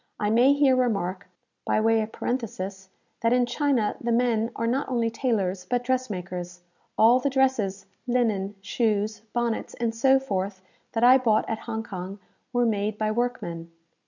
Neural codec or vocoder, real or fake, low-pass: none; real; 7.2 kHz